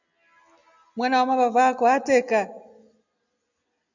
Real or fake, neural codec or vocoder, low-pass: real; none; 7.2 kHz